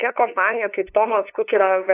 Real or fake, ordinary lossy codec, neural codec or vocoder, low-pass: fake; AAC, 24 kbps; codec, 16 kHz, 2 kbps, FunCodec, trained on LibriTTS, 25 frames a second; 3.6 kHz